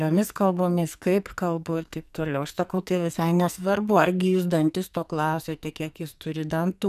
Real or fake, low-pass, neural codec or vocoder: fake; 14.4 kHz; codec, 44.1 kHz, 2.6 kbps, SNAC